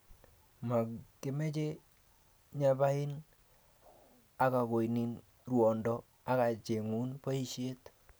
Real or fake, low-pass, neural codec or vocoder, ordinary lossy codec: real; none; none; none